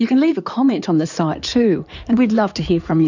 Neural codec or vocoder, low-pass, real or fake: codec, 16 kHz in and 24 kHz out, 2.2 kbps, FireRedTTS-2 codec; 7.2 kHz; fake